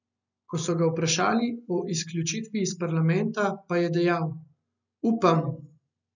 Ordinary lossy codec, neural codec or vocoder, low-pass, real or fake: none; none; 7.2 kHz; real